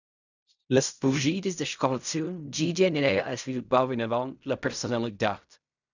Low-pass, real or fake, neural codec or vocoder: 7.2 kHz; fake; codec, 16 kHz in and 24 kHz out, 0.4 kbps, LongCat-Audio-Codec, fine tuned four codebook decoder